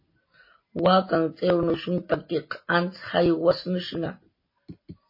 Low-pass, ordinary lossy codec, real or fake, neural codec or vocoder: 5.4 kHz; MP3, 24 kbps; real; none